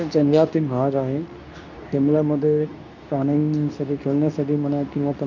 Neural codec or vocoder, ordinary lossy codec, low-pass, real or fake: codec, 24 kHz, 0.9 kbps, WavTokenizer, medium speech release version 2; none; 7.2 kHz; fake